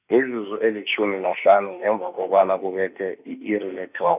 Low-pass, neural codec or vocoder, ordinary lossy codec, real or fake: 3.6 kHz; autoencoder, 48 kHz, 32 numbers a frame, DAC-VAE, trained on Japanese speech; none; fake